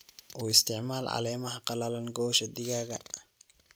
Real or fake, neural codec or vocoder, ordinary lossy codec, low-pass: real; none; none; none